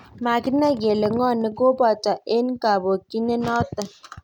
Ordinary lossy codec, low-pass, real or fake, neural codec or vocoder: none; 19.8 kHz; real; none